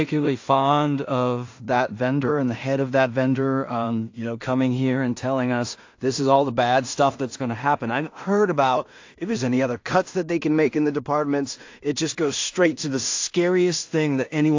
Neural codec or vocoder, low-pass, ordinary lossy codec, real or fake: codec, 16 kHz in and 24 kHz out, 0.4 kbps, LongCat-Audio-Codec, two codebook decoder; 7.2 kHz; AAC, 48 kbps; fake